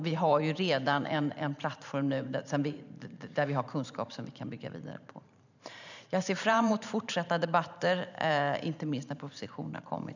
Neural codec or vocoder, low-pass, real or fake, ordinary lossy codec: vocoder, 44.1 kHz, 128 mel bands every 512 samples, BigVGAN v2; 7.2 kHz; fake; none